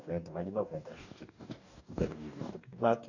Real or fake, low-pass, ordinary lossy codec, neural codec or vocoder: fake; 7.2 kHz; none; codec, 44.1 kHz, 2.6 kbps, DAC